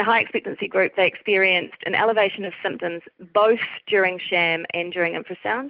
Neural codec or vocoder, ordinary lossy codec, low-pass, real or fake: none; Opus, 32 kbps; 5.4 kHz; real